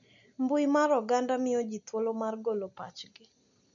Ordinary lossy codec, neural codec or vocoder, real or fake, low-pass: none; none; real; 7.2 kHz